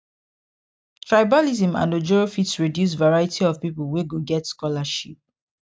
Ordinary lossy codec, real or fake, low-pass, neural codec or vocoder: none; real; none; none